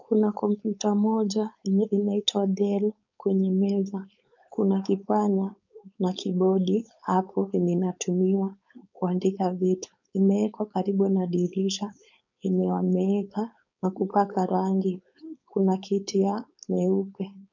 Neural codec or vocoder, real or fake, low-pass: codec, 16 kHz, 4.8 kbps, FACodec; fake; 7.2 kHz